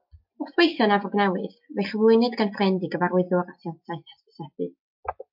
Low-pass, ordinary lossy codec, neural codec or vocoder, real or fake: 5.4 kHz; MP3, 48 kbps; none; real